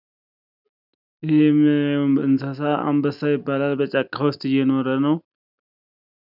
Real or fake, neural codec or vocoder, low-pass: real; none; 5.4 kHz